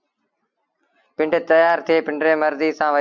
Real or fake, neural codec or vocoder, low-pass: real; none; 7.2 kHz